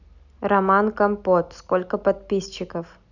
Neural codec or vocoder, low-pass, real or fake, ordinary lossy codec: none; 7.2 kHz; real; none